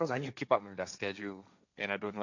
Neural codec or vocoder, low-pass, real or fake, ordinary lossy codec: codec, 16 kHz, 1.1 kbps, Voila-Tokenizer; 7.2 kHz; fake; none